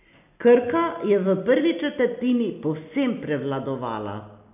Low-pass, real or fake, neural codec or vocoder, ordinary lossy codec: 3.6 kHz; real; none; AAC, 32 kbps